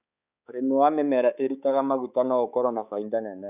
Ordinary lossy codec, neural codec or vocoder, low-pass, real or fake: none; codec, 16 kHz, 4 kbps, X-Codec, HuBERT features, trained on balanced general audio; 3.6 kHz; fake